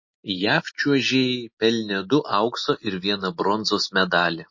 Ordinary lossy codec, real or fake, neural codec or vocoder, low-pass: MP3, 32 kbps; real; none; 7.2 kHz